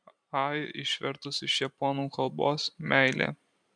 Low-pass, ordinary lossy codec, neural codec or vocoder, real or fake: 9.9 kHz; MP3, 96 kbps; vocoder, 44.1 kHz, 128 mel bands every 512 samples, BigVGAN v2; fake